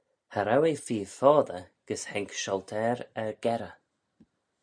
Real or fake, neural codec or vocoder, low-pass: real; none; 9.9 kHz